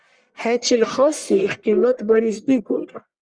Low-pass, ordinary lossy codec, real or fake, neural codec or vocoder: 9.9 kHz; Opus, 64 kbps; fake; codec, 44.1 kHz, 1.7 kbps, Pupu-Codec